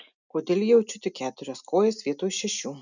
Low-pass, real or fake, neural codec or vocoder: 7.2 kHz; real; none